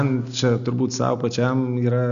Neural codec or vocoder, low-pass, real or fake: none; 7.2 kHz; real